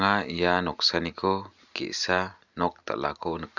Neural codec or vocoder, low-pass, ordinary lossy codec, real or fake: none; 7.2 kHz; none; real